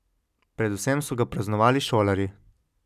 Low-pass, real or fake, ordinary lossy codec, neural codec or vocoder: 14.4 kHz; real; none; none